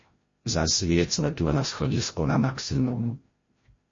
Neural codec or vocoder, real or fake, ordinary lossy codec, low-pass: codec, 16 kHz, 0.5 kbps, FreqCodec, larger model; fake; MP3, 32 kbps; 7.2 kHz